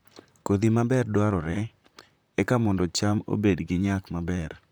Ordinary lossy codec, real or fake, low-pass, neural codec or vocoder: none; fake; none; vocoder, 44.1 kHz, 128 mel bands, Pupu-Vocoder